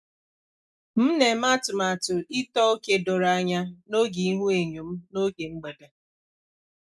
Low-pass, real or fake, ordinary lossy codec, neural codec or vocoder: none; real; none; none